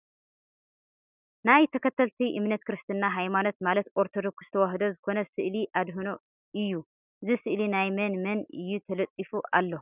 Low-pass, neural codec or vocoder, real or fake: 3.6 kHz; none; real